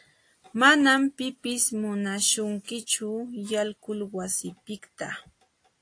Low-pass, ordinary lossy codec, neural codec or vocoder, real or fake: 9.9 kHz; AAC, 48 kbps; none; real